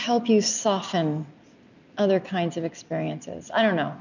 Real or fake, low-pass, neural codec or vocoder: real; 7.2 kHz; none